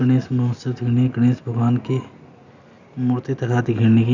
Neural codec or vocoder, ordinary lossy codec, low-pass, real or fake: none; none; 7.2 kHz; real